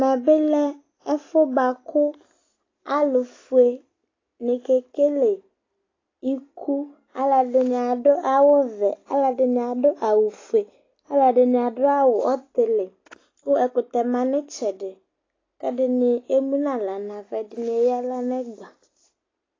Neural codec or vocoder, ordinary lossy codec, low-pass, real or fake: none; AAC, 32 kbps; 7.2 kHz; real